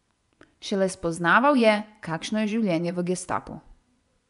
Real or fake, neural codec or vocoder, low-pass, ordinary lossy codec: fake; vocoder, 24 kHz, 100 mel bands, Vocos; 10.8 kHz; none